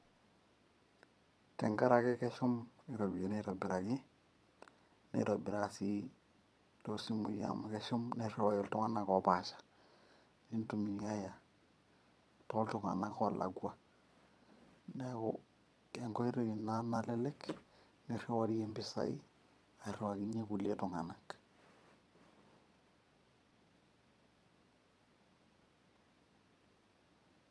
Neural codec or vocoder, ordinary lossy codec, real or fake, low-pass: vocoder, 22.05 kHz, 80 mel bands, Vocos; none; fake; none